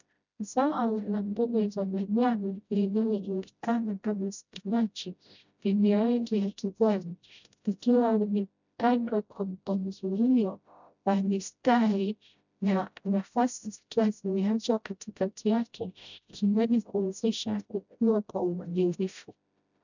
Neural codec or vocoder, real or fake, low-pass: codec, 16 kHz, 0.5 kbps, FreqCodec, smaller model; fake; 7.2 kHz